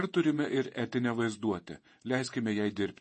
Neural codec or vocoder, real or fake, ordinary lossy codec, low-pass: vocoder, 48 kHz, 128 mel bands, Vocos; fake; MP3, 32 kbps; 10.8 kHz